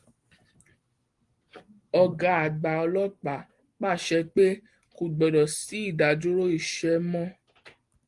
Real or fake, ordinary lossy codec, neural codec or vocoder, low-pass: real; Opus, 24 kbps; none; 10.8 kHz